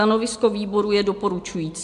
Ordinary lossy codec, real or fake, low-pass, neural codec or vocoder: AAC, 64 kbps; real; 10.8 kHz; none